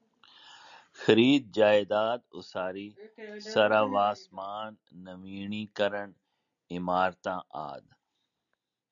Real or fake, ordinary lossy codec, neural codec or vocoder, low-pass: real; MP3, 96 kbps; none; 7.2 kHz